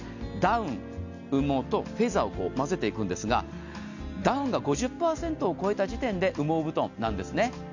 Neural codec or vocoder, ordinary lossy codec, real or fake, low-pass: none; none; real; 7.2 kHz